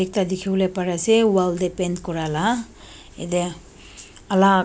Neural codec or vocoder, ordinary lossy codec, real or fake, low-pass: none; none; real; none